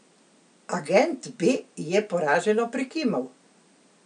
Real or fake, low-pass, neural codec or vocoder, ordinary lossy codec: real; 9.9 kHz; none; none